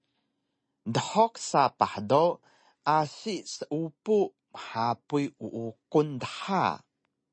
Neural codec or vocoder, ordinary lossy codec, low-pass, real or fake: none; MP3, 32 kbps; 9.9 kHz; real